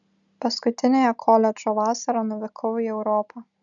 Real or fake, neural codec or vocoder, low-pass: real; none; 7.2 kHz